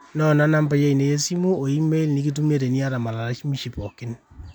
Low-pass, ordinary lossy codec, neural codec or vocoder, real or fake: 19.8 kHz; none; none; real